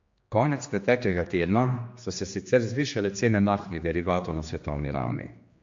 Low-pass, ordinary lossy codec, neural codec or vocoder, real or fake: 7.2 kHz; MP3, 48 kbps; codec, 16 kHz, 2 kbps, X-Codec, HuBERT features, trained on general audio; fake